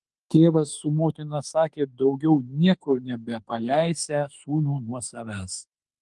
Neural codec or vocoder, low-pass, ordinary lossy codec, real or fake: autoencoder, 48 kHz, 32 numbers a frame, DAC-VAE, trained on Japanese speech; 10.8 kHz; Opus, 24 kbps; fake